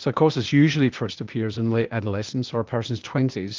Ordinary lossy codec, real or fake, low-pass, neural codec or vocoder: Opus, 24 kbps; fake; 7.2 kHz; codec, 16 kHz, 0.8 kbps, ZipCodec